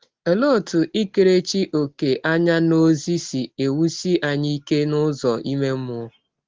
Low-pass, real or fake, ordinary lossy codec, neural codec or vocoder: 7.2 kHz; real; Opus, 16 kbps; none